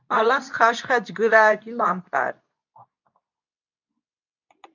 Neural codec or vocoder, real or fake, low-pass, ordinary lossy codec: codec, 24 kHz, 0.9 kbps, WavTokenizer, medium speech release version 2; fake; 7.2 kHz; MP3, 64 kbps